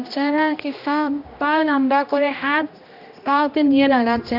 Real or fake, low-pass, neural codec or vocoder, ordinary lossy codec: fake; 5.4 kHz; codec, 16 kHz, 1 kbps, X-Codec, HuBERT features, trained on general audio; none